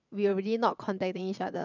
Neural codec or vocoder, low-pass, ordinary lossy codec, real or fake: vocoder, 22.05 kHz, 80 mel bands, WaveNeXt; 7.2 kHz; none; fake